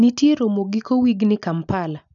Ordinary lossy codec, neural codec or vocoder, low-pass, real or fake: none; none; 7.2 kHz; real